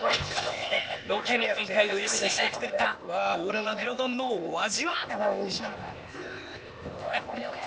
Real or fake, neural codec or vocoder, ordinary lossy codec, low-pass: fake; codec, 16 kHz, 0.8 kbps, ZipCodec; none; none